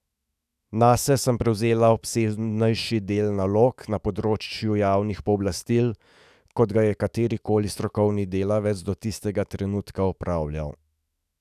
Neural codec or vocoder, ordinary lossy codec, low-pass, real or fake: autoencoder, 48 kHz, 128 numbers a frame, DAC-VAE, trained on Japanese speech; none; 14.4 kHz; fake